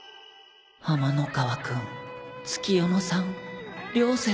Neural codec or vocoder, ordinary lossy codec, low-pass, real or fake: none; none; none; real